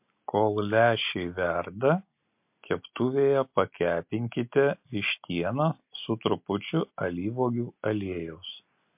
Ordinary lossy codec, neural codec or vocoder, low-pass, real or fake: MP3, 32 kbps; none; 3.6 kHz; real